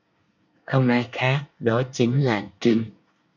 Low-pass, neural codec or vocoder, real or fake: 7.2 kHz; codec, 24 kHz, 1 kbps, SNAC; fake